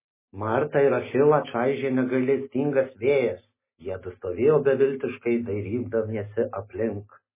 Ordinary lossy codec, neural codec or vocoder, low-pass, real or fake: MP3, 16 kbps; none; 3.6 kHz; real